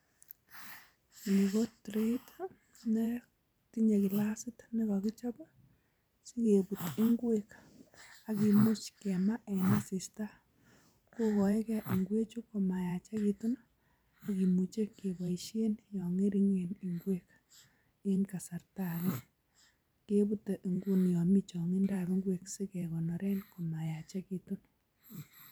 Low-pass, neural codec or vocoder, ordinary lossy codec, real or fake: none; vocoder, 44.1 kHz, 128 mel bands every 512 samples, BigVGAN v2; none; fake